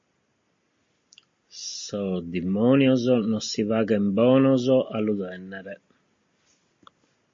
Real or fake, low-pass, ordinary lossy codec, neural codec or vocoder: real; 7.2 kHz; MP3, 32 kbps; none